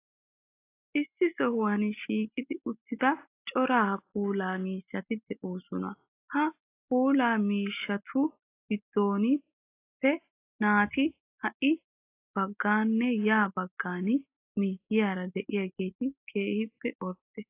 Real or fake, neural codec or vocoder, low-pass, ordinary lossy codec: real; none; 3.6 kHz; AAC, 24 kbps